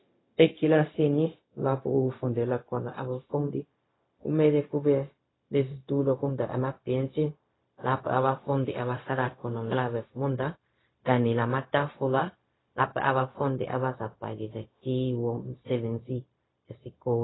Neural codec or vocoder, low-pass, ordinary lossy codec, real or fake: codec, 16 kHz, 0.4 kbps, LongCat-Audio-Codec; 7.2 kHz; AAC, 16 kbps; fake